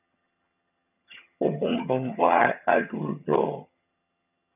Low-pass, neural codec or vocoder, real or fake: 3.6 kHz; vocoder, 22.05 kHz, 80 mel bands, HiFi-GAN; fake